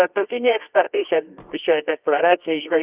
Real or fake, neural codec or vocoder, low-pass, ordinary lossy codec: fake; codec, 24 kHz, 0.9 kbps, WavTokenizer, medium music audio release; 3.6 kHz; Opus, 64 kbps